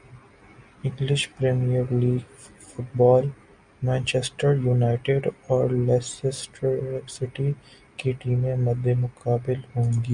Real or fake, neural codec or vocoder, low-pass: real; none; 9.9 kHz